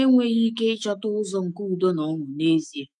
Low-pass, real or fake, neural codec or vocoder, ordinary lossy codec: 10.8 kHz; fake; autoencoder, 48 kHz, 128 numbers a frame, DAC-VAE, trained on Japanese speech; none